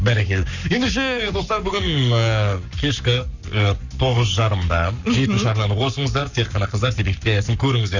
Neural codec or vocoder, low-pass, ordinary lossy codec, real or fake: codec, 44.1 kHz, 7.8 kbps, Pupu-Codec; 7.2 kHz; none; fake